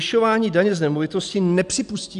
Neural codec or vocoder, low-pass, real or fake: none; 10.8 kHz; real